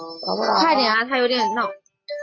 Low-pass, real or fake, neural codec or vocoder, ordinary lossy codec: 7.2 kHz; real; none; AAC, 32 kbps